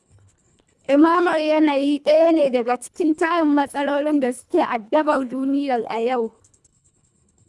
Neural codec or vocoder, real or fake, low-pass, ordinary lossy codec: codec, 24 kHz, 1.5 kbps, HILCodec; fake; none; none